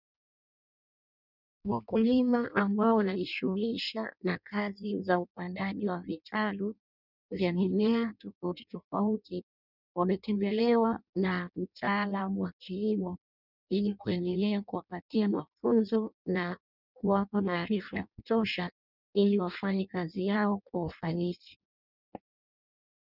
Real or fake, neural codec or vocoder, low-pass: fake; codec, 16 kHz in and 24 kHz out, 0.6 kbps, FireRedTTS-2 codec; 5.4 kHz